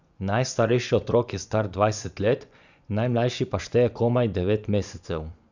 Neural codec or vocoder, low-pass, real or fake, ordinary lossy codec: vocoder, 24 kHz, 100 mel bands, Vocos; 7.2 kHz; fake; none